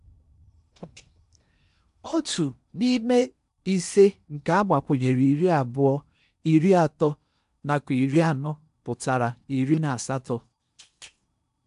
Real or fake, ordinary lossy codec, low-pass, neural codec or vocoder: fake; MP3, 96 kbps; 10.8 kHz; codec, 16 kHz in and 24 kHz out, 0.8 kbps, FocalCodec, streaming, 65536 codes